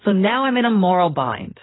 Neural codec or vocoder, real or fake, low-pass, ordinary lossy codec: codec, 16 kHz in and 24 kHz out, 2.2 kbps, FireRedTTS-2 codec; fake; 7.2 kHz; AAC, 16 kbps